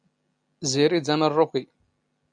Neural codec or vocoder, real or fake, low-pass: none; real; 9.9 kHz